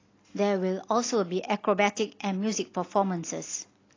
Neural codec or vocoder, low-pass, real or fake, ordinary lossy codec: none; 7.2 kHz; real; AAC, 32 kbps